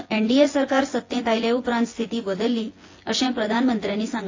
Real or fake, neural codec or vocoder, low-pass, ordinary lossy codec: fake; vocoder, 24 kHz, 100 mel bands, Vocos; 7.2 kHz; AAC, 32 kbps